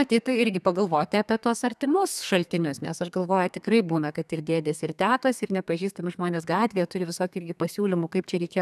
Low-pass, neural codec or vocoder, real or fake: 14.4 kHz; codec, 32 kHz, 1.9 kbps, SNAC; fake